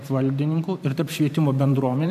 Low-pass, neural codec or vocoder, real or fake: 14.4 kHz; vocoder, 44.1 kHz, 128 mel bands every 512 samples, BigVGAN v2; fake